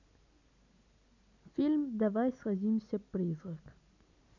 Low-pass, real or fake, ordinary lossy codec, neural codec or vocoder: 7.2 kHz; real; none; none